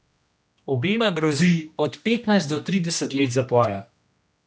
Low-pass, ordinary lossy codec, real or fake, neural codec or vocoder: none; none; fake; codec, 16 kHz, 1 kbps, X-Codec, HuBERT features, trained on general audio